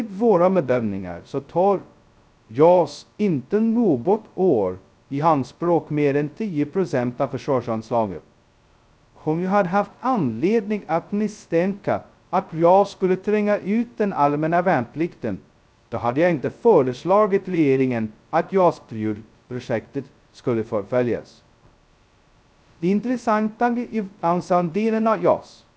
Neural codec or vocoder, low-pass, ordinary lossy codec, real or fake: codec, 16 kHz, 0.2 kbps, FocalCodec; none; none; fake